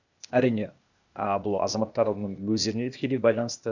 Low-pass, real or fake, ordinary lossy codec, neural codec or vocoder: 7.2 kHz; fake; none; codec, 16 kHz, 0.8 kbps, ZipCodec